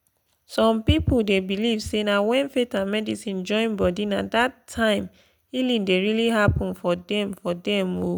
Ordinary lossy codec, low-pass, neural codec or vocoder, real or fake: none; none; none; real